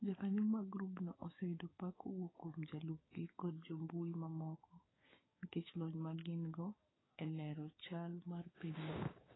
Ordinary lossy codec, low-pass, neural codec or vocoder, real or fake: AAC, 16 kbps; 7.2 kHz; codec, 16 kHz, 6 kbps, DAC; fake